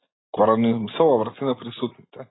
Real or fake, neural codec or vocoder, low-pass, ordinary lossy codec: real; none; 7.2 kHz; AAC, 16 kbps